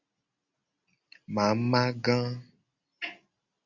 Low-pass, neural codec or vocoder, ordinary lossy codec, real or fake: 7.2 kHz; none; Opus, 64 kbps; real